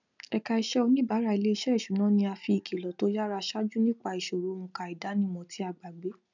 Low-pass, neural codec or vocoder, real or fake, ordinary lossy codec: 7.2 kHz; none; real; none